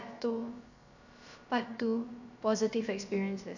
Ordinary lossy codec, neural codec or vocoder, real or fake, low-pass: none; codec, 16 kHz, about 1 kbps, DyCAST, with the encoder's durations; fake; 7.2 kHz